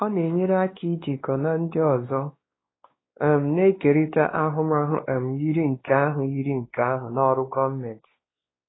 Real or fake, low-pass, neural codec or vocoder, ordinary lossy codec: fake; 7.2 kHz; codec, 16 kHz, 2 kbps, X-Codec, WavLM features, trained on Multilingual LibriSpeech; AAC, 16 kbps